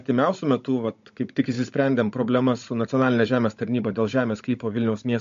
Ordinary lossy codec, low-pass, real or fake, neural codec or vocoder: MP3, 48 kbps; 7.2 kHz; fake; codec, 16 kHz, 16 kbps, FunCodec, trained on Chinese and English, 50 frames a second